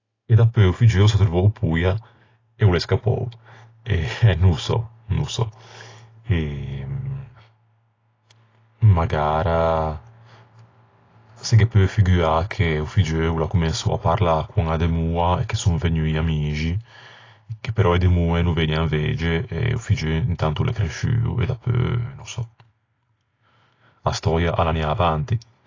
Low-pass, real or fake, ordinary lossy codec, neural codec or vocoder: 7.2 kHz; real; AAC, 32 kbps; none